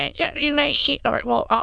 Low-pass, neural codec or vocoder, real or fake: 9.9 kHz; autoencoder, 22.05 kHz, a latent of 192 numbers a frame, VITS, trained on many speakers; fake